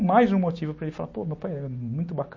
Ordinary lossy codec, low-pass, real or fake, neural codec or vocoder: MP3, 32 kbps; 7.2 kHz; real; none